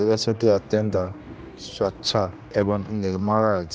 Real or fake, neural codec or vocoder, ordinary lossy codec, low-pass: fake; codec, 16 kHz, 1 kbps, X-Codec, HuBERT features, trained on general audio; none; none